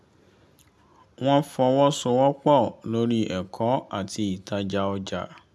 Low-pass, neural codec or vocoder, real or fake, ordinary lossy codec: none; none; real; none